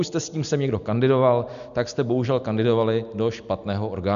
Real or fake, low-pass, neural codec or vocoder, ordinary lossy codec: real; 7.2 kHz; none; AAC, 96 kbps